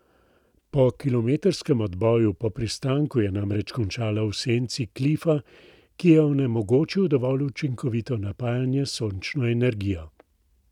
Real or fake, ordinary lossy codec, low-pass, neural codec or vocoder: real; none; 19.8 kHz; none